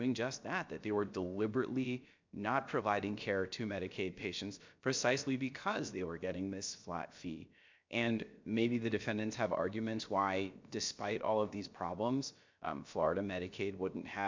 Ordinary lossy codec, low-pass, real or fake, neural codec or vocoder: MP3, 64 kbps; 7.2 kHz; fake; codec, 16 kHz, about 1 kbps, DyCAST, with the encoder's durations